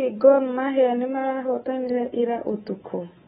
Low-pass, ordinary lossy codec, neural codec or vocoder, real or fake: 10.8 kHz; AAC, 16 kbps; codec, 24 kHz, 3.1 kbps, DualCodec; fake